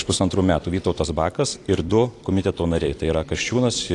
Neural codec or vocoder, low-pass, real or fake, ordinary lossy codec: vocoder, 44.1 kHz, 128 mel bands every 256 samples, BigVGAN v2; 10.8 kHz; fake; AAC, 48 kbps